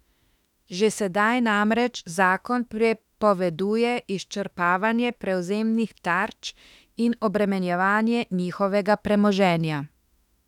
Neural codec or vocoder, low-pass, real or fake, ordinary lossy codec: autoencoder, 48 kHz, 32 numbers a frame, DAC-VAE, trained on Japanese speech; 19.8 kHz; fake; none